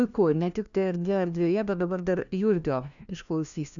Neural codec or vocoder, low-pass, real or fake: codec, 16 kHz, 1 kbps, FunCodec, trained on LibriTTS, 50 frames a second; 7.2 kHz; fake